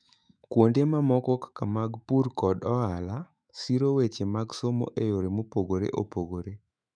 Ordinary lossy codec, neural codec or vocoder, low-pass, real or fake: none; autoencoder, 48 kHz, 128 numbers a frame, DAC-VAE, trained on Japanese speech; 9.9 kHz; fake